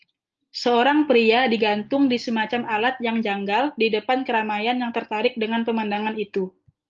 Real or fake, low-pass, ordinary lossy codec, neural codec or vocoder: real; 7.2 kHz; Opus, 24 kbps; none